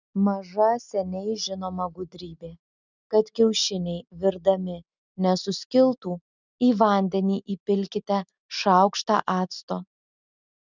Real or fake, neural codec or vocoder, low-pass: real; none; 7.2 kHz